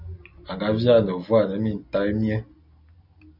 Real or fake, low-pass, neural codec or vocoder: real; 5.4 kHz; none